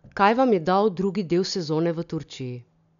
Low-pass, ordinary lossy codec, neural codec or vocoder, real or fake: 7.2 kHz; none; none; real